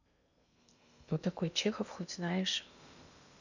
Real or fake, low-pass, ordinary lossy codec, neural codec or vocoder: fake; 7.2 kHz; none; codec, 16 kHz in and 24 kHz out, 0.8 kbps, FocalCodec, streaming, 65536 codes